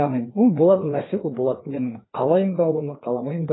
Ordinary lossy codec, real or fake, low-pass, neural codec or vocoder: AAC, 16 kbps; fake; 7.2 kHz; codec, 16 kHz, 2 kbps, FreqCodec, larger model